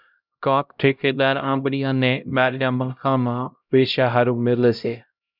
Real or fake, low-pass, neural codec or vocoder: fake; 5.4 kHz; codec, 16 kHz, 0.5 kbps, X-Codec, HuBERT features, trained on LibriSpeech